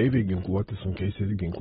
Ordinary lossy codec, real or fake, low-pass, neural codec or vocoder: AAC, 16 kbps; real; 19.8 kHz; none